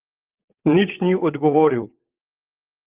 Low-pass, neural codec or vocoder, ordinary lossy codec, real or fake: 3.6 kHz; vocoder, 22.05 kHz, 80 mel bands, WaveNeXt; Opus, 32 kbps; fake